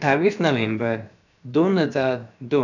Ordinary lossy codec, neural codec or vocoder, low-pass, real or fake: none; codec, 16 kHz, about 1 kbps, DyCAST, with the encoder's durations; 7.2 kHz; fake